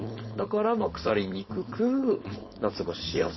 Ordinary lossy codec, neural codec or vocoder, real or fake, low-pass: MP3, 24 kbps; codec, 16 kHz, 4.8 kbps, FACodec; fake; 7.2 kHz